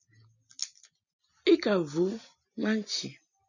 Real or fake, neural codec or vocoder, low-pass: real; none; 7.2 kHz